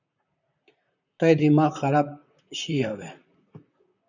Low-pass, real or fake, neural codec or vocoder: 7.2 kHz; fake; vocoder, 44.1 kHz, 128 mel bands, Pupu-Vocoder